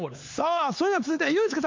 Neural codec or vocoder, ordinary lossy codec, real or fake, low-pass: codec, 16 kHz, 4 kbps, X-Codec, HuBERT features, trained on LibriSpeech; MP3, 64 kbps; fake; 7.2 kHz